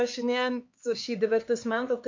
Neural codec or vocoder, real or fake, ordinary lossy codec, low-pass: codec, 16 kHz, 4 kbps, X-Codec, HuBERT features, trained on LibriSpeech; fake; MP3, 48 kbps; 7.2 kHz